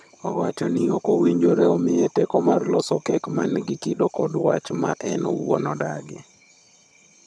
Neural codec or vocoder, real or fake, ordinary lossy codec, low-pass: vocoder, 22.05 kHz, 80 mel bands, HiFi-GAN; fake; none; none